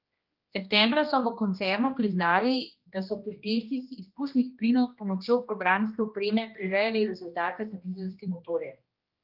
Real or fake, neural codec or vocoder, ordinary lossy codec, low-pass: fake; codec, 16 kHz, 1 kbps, X-Codec, HuBERT features, trained on general audio; Opus, 24 kbps; 5.4 kHz